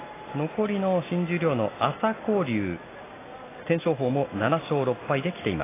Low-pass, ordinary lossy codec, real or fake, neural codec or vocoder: 3.6 kHz; AAC, 16 kbps; real; none